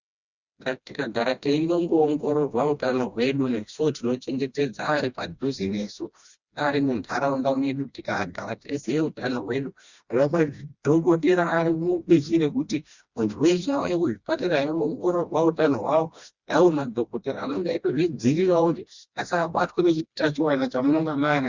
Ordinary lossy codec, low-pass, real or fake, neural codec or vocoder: Opus, 64 kbps; 7.2 kHz; fake; codec, 16 kHz, 1 kbps, FreqCodec, smaller model